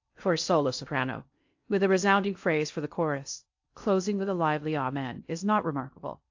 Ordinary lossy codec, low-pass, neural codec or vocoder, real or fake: AAC, 48 kbps; 7.2 kHz; codec, 16 kHz in and 24 kHz out, 0.6 kbps, FocalCodec, streaming, 4096 codes; fake